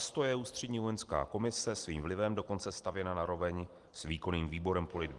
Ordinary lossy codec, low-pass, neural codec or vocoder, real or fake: Opus, 24 kbps; 10.8 kHz; none; real